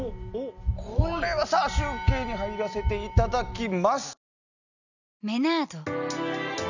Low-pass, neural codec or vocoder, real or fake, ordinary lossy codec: 7.2 kHz; none; real; none